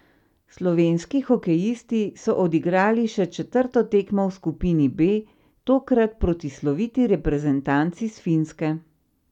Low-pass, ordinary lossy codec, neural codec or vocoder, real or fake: 19.8 kHz; none; none; real